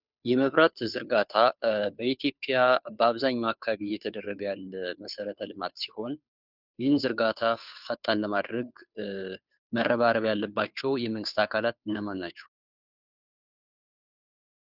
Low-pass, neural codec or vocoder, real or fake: 5.4 kHz; codec, 16 kHz, 2 kbps, FunCodec, trained on Chinese and English, 25 frames a second; fake